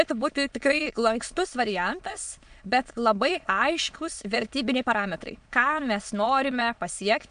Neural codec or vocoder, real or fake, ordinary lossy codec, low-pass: autoencoder, 22.05 kHz, a latent of 192 numbers a frame, VITS, trained on many speakers; fake; MP3, 64 kbps; 9.9 kHz